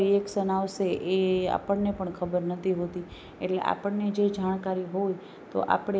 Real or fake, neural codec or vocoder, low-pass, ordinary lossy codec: real; none; none; none